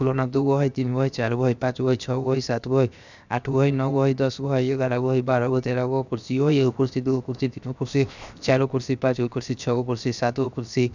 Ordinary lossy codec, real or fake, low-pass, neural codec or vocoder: none; fake; 7.2 kHz; codec, 16 kHz, 0.7 kbps, FocalCodec